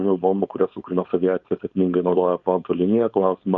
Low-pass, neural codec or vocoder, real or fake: 7.2 kHz; codec, 16 kHz, 4.8 kbps, FACodec; fake